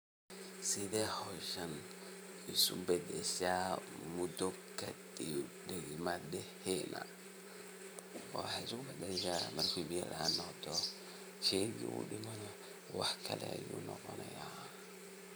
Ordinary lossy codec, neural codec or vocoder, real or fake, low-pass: none; vocoder, 44.1 kHz, 128 mel bands every 512 samples, BigVGAN v2; fake; none